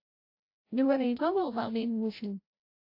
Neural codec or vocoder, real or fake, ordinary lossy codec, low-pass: codec, 16 kHz, 0.5 kbps, FreqCodec, larger model; fake; AAC, 32 kbps; 5.4 kHz